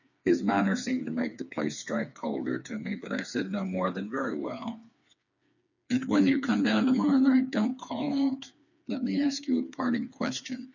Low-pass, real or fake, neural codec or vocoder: 7.2 kHz; fake; codec, 16 kHz, 4 kbps, FreqCodec, smaller model